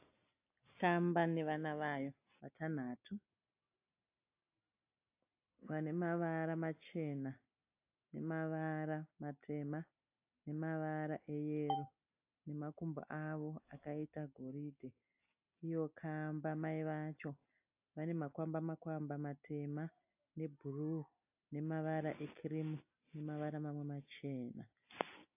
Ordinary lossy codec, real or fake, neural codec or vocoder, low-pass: AAC, 32 kbps; real; none; 3.6 kHz